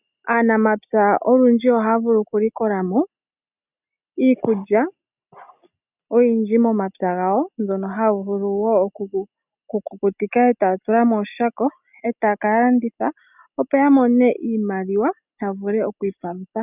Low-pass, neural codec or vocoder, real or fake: 3.6 kHz; none; real